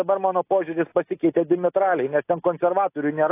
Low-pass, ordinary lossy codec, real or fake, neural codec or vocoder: 3.6 kHz; AAC, 24 kbps; real; none